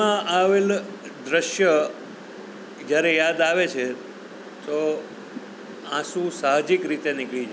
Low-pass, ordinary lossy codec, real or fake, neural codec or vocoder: none; none; real; none